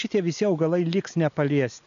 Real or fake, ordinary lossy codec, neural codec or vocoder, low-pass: real; AAC, 96 kbps; none; 7.2 kHz